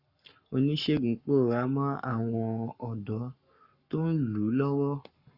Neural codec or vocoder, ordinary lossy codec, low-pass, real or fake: codec, 44.1 kHz, 7.8 kbps, Pupu-Codec; Opus, 64 kbps; 5.4 kHz; fake